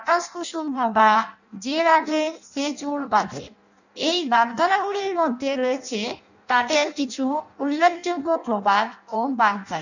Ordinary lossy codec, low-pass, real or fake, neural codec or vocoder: none; 7.2 kHz; fake; codec, 16 kHz in and 24 kHz out, 0.6 kbps, FireRedTTS-2 codec